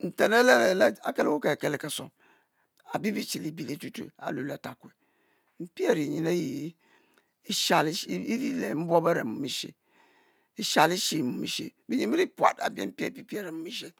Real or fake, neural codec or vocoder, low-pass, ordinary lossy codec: real; none; none; none